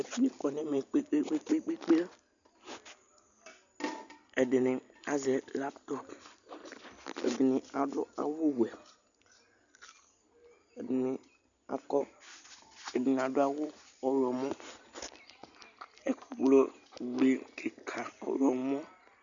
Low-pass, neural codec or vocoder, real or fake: 7.2 kHz; none; real